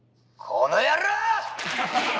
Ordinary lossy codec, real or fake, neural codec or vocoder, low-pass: none; real; none; none